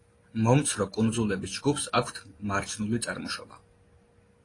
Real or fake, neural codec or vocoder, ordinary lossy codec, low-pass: real; none; AAC, 32 kbps; 10.8 kHz